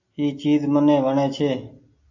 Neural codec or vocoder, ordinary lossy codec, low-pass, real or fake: none; AAC, 48 kbps; 7.2 kHz; real